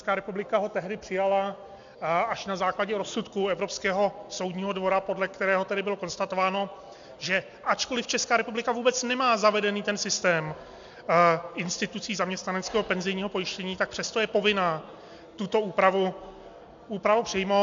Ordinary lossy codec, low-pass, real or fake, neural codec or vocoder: MP3, 64 kbps; 7.2 kHz; real; none